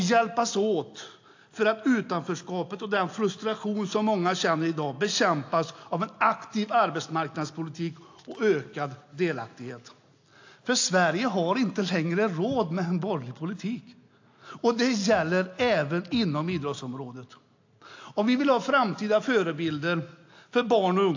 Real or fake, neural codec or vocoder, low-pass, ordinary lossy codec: real; none; 7.2 kHz; AAC, 48 kbps